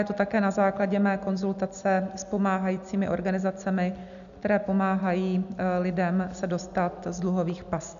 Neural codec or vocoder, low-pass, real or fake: none; 7.2 kHz; real